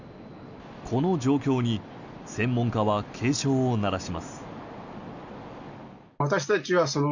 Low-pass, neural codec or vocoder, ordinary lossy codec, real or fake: 7.2 kHz; none; none; real